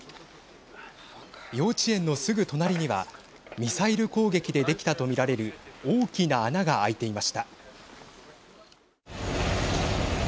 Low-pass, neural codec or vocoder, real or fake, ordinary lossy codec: none; none; real; none